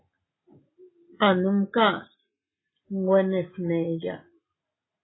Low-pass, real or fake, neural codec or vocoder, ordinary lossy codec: 7.2 kHz; real; none; AAC, 16 kbps